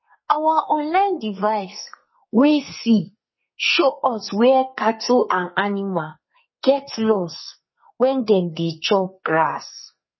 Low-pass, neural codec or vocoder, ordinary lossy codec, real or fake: 7.2 kHz; codec, 44.1 kHz, 2.6 kbps, SNAC; MP3, 24 kbps; fake